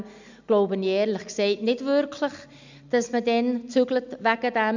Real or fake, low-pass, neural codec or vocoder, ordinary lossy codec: real; 7.2 kHz; none; none